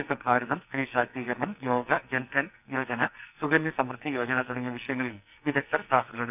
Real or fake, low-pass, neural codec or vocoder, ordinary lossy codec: fake; 3.6 kHz; codec, 44.1 kHz, 2.6 kbps, SNAC; none